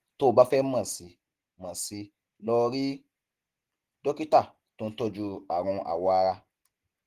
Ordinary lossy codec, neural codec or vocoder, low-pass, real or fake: Opus, 16 kbps; none; 14.4 kHz; real